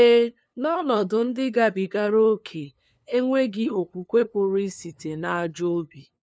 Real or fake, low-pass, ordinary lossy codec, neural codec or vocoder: fake; none; none; codec, 16 kHz, 2 kbps, FunCodec, trained on LibriTTS, 25 frames a second